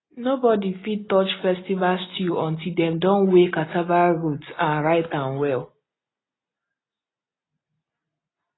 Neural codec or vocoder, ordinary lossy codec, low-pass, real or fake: none; AAC, 16 kbps; 7.2 kHz; real